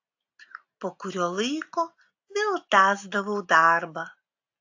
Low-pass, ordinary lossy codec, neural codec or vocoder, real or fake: 7.2 kHz; AAC, 48 kbps; none; real